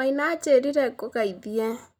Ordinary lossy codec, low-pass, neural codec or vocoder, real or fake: none; 19.8 kHz; none; real